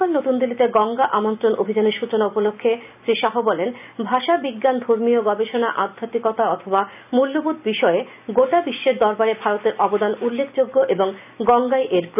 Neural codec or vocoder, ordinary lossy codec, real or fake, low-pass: none; none; real; 3.6 kHz